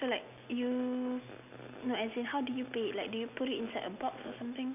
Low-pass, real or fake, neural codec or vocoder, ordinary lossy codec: 3.6 kHz; real; none; none